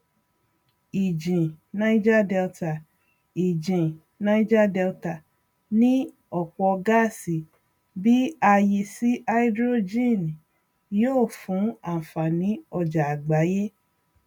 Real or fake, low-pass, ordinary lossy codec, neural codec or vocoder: real; 19.8 kHz; none; none